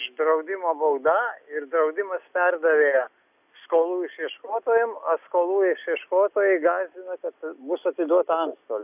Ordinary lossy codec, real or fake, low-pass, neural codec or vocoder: AAC, 32 kbps; real; 3.6 kHz; none